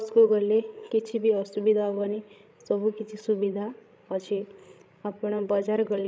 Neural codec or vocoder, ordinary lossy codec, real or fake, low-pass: codec, 16 kHz, 16 kbps, FreqCodec, larger model; none; fake; none